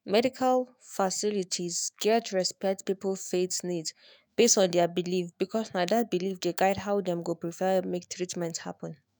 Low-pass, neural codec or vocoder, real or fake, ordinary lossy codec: none; autoencoder, 48 kHz, 128 numbers a frame, DAC-VAE, trained on Japanese speech; fake; none